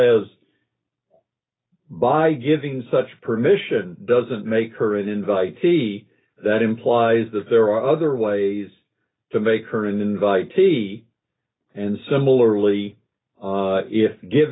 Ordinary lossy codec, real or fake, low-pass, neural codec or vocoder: AAC, 16 kbps; real; 7.2 kHz; none